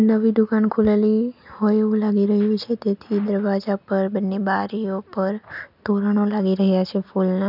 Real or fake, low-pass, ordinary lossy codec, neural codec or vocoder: real; 5.4 kHz; none; none